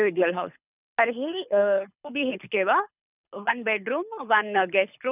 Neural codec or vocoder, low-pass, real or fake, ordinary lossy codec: codec, 24 kHz, 6 kbps, HILCodec; 3.6 kHz; fake; none